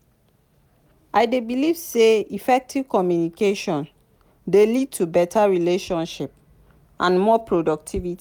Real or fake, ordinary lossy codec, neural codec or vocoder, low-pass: real; none; none; 19.8 kHz